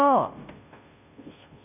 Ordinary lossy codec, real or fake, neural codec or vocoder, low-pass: none; fake; codec, 16 kHz, 0.5 kbps, FunCodec, trained on Chinese and English, 25 frames a second; 3.6 kHz